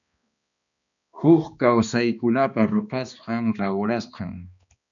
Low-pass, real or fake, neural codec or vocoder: 7.2 kHz; fake; codec, 16 kHz, 2 kbps, X-Codec, HuBERT features, trained on balanced general audio